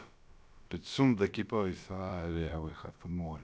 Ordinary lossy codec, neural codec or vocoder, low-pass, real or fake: none; codec, 16 kHz, about 1 kbps, DyCAST, with the encoder's durations; none; fake